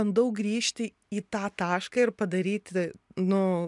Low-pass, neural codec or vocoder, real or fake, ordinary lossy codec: 10.8 kHz; none; real; MP3, 96 kbps